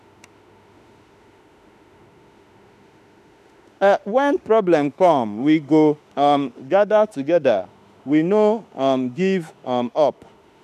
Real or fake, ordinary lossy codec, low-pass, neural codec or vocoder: fake; none; 14.4 kHz; autoencoder, 48 kHz, 32 numbers a frame, DAC-VAE, trained on Japanese speech